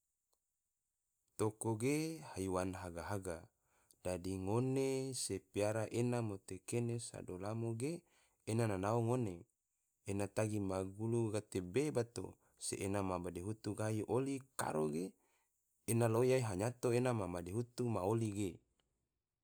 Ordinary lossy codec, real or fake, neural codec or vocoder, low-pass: none; real; none; none